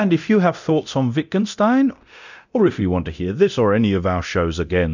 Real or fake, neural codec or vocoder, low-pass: fake; codec, 24 kHz, 0.9 kbps, DualCodec; 7.2 kHz